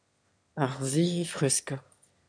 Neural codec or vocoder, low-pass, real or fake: autoencoder, 22.05 kHz, a latent of 192 numbers a frame, VITS, trained on one speaker; 9.9 kHz; fake